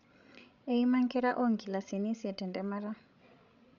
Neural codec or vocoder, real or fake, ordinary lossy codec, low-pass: codec, 16 kHz, 16 kbps, FreqCodec, larger model; fake; none; 7.2 kHz